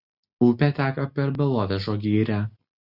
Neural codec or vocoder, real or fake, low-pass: none; real; 5.4 kHz